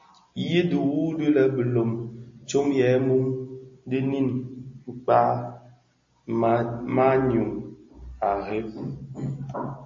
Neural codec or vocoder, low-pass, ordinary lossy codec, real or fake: none; 7.2 kHz; MP3, 32 kbps; real